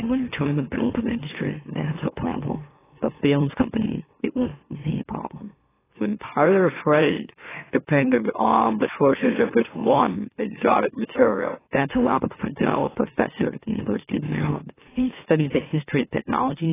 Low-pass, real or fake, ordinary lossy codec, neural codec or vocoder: 3.6 kHz; fake; AAC, 16 kbps; autoencoder, 44.1 kHz, a latent of 192 numbers a frame, MeloTTS